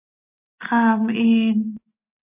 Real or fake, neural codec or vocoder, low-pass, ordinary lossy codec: real; none; 3.6 kHz; AAC, 32 kbps